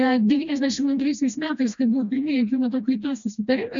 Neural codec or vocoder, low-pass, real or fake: codec, 16 kHz, 1 kbps, FreqCodec, smaller model; 7.2 kHz; fake